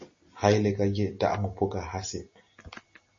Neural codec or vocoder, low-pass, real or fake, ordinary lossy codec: none; 7.2 kHz; real; MP3, 32 kbps